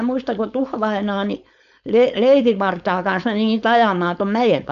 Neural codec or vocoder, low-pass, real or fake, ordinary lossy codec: codec, 16 kHz, 4.8 kbps, FACodec; 7.2 kHz; fake; none